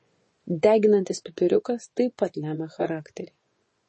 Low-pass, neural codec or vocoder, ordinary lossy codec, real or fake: 10.8 kHz; vocoder, 24 kHz, 100 mel bands, Vocos; MP3, 32 kbps; fake